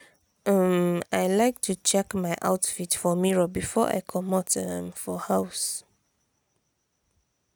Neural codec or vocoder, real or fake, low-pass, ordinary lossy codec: none; real; none; none